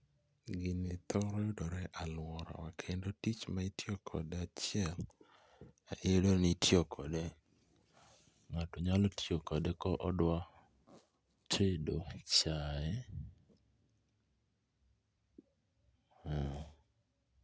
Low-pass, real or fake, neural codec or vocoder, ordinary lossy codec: none; real; none; none